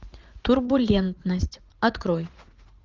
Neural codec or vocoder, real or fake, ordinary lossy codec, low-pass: vocoder, 44.1 kHz, 80 mel bands, Vocos; fake; Opus, 24 kbps; 7.2 kHz